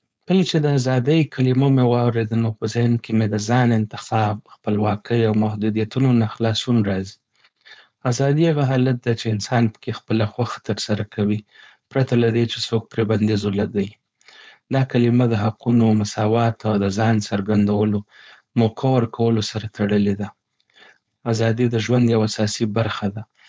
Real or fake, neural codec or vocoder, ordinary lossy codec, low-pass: fake; codec, 16 kHz, 4.8 kbps, FACodec; none; none